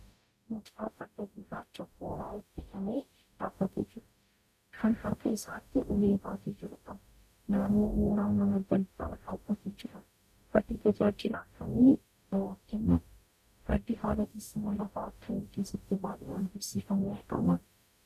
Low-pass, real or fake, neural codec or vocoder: 14.4 kHz; fake; codec, 44.1 kHz, 0.9 kbps, DAC